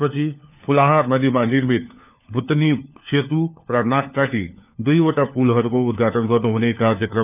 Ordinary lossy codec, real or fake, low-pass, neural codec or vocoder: none; fake; 3.6 kHz; codec, 16 kHz, 4 kbps, FunCodec, trained on LibriTTS, 50 frames a second